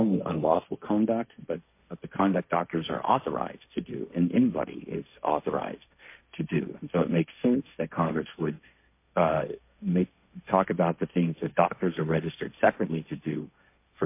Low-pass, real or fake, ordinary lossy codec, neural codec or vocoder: 3.6 kHz; fake; MP3, 24 kbps; codec, 16 kHz, 1.1 kbps, Voila-Tokenizer